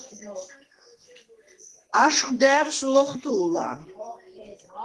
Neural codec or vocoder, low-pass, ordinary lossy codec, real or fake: codec, 32 kHz, 1.9 kbps, SNAC; 10.8 kHz; Opus, 16 kbps; fake